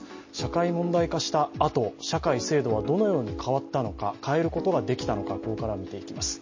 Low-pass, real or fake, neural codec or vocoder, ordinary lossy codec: 7.2 kHz; real; none; MP3, 32 kbps